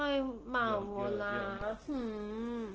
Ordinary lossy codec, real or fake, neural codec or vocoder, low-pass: Opus, 16 kbps; real; none; 7.2 kHz